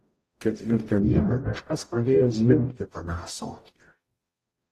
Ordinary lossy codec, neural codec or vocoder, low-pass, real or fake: AAC, 48 kbps; codec, 44.1 kHz, 0.9 kbps, DAC; 14.4 kHz; fake